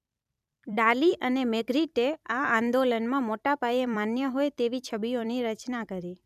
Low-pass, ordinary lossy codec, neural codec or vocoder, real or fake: 14.4 kHz; none; vocoder, 44.1 kHz, 128 mel bands every 256 samples, BigVGAN v2; fake